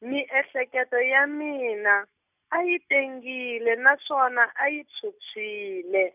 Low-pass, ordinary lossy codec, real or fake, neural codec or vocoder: 3.6 kHz; none; real; none